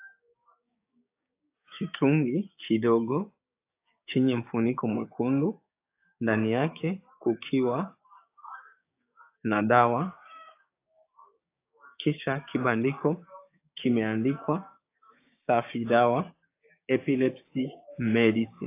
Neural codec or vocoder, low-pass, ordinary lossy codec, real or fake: codec, 16 kHz, 6 kbps, DAC; 3.6 kHz; AAC, 24 kbps; fake